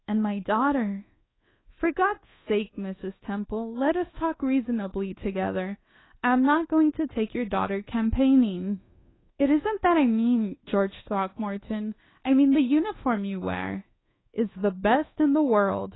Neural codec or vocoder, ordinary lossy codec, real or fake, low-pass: codec, 24 kHz, 1.2 kbps, DualCodec; AAC, 16 kbps; fake; 7.2 kHz